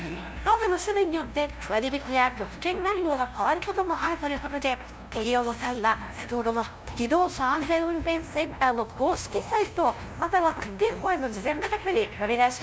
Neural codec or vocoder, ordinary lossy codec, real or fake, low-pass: codec, 16 kHz, 0.5 kbps, FunCodec, trained on LibriTTS, 25 frames a second; none; fake; none